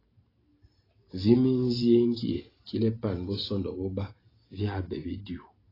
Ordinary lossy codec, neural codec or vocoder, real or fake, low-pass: AAC, 24 kbps; vocoder, 44.1 kHz, 128 mel bands every 512 samples, BigVGAN v2; fake; 5.4 kHz